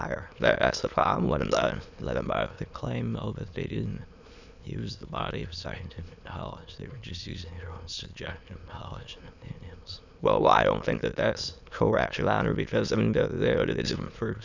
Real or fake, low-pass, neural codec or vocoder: fake; 7.2 kHz; autoencoder, 22.05 kHz, a latent of 192 numbers a frame, VITS, trained on many speakers